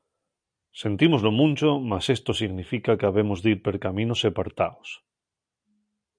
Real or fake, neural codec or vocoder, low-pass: real; none; 9.9 kHz